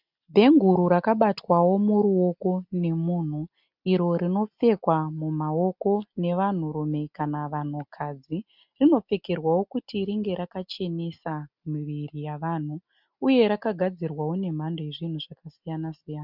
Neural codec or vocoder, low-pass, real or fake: none; 5.4 kHz; real